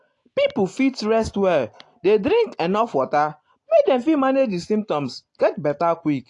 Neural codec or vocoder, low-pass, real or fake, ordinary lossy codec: none; 10.8 kHz; real; AAC, 48 kbps